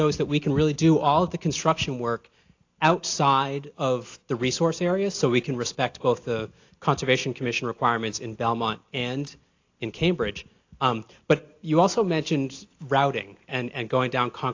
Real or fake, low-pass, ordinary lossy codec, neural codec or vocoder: real; 7.2 kHz; AAC, 48 kbps; none